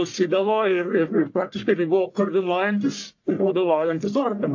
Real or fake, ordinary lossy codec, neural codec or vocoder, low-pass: fake; AAC, 48 kbps; codec, 44.1 kHz, 1.7 kbps, Pupu-Codec; 7.2 kHz